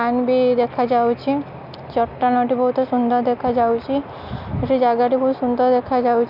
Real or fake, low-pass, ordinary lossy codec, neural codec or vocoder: real; 5.4 kHz; none; none